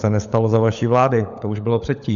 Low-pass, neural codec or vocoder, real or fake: 7.2 kHz; codec, 16 kHz, 16 kbps, FunCodec, trained on LibriTTS, 50 frames a second; fake